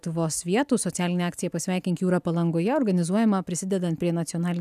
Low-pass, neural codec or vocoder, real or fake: 14.4 kHz; none; real